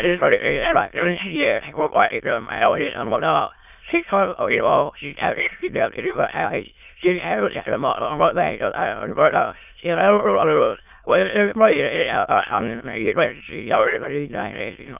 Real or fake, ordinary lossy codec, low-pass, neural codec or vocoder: fake; none; 3.6 kHz; autoencoder, 22.05 kHz, a latent of 192 numbers a frame, VITS, trained on many speakers